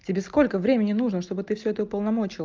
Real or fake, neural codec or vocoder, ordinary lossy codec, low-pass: real; none; Opus, 24 kbps; 7.2 kHz